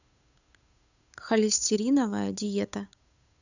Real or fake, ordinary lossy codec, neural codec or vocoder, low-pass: fake; none; codec, 16 kHz, 8 kbps, FunCodec, trained on Chinese and English, 25 frames a second; 7.2 kHz